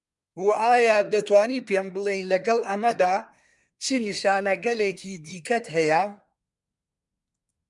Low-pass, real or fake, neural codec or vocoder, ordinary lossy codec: 10.8 kHz; fake; codec, 24 kHz, 1 kbps, SNAC; MP3, 96 kbps